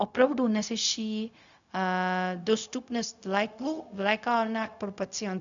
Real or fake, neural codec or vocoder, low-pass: fake; codec, 16 kHz, 0.4 kbps, LongCat-Audio-Codec; 7.2 kHz